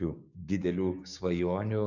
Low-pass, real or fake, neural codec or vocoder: 7.2 kHz; fake; codec, 16 kHz, 6 kbps, DAC